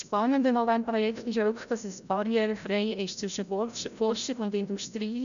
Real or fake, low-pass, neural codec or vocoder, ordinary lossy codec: fake; 7.2 kHz; codec, 16 kHz, 0.5 kbps, FreqCodec, larger model; none